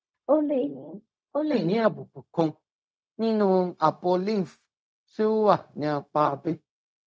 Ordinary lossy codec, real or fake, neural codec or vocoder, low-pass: none; fake; codec, 16 kHz, 0.4 kbps, LongCat-Audio-Codec; none